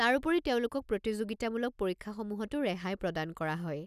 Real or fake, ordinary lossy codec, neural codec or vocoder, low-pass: real; none; none; 14.4 kHz